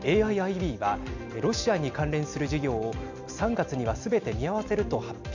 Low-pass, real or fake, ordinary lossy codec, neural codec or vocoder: 7.2 kHz; real; none; none